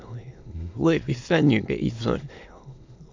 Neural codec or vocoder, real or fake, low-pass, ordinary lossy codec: autoencoder, 22.05 kHz, a latent of 192 numbers a frame, VITS, trained on many speakers; fake; 7.2 kHz; MP3, 64 kbps